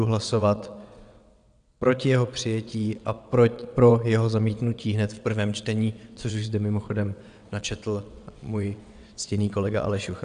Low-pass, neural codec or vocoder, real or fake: 9.9 kHz; vocoder, 22.05 kHz, 80 mel bands, WaveNeXt; fake